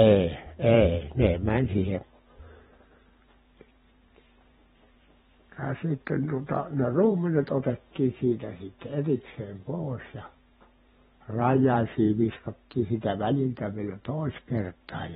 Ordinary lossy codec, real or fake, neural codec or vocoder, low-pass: AAC, 16 kbps; fake; vocoder, 44.1 kHz, 128 mel bands every 512 samples, BigVGAN v2; 19.8 kHz